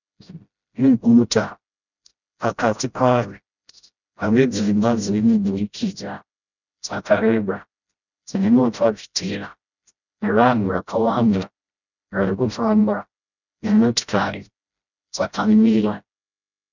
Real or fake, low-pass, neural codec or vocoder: fake; 7.2 kHz; codec, 16 kHz, 0.5 kbps, FreqCodec, smaller model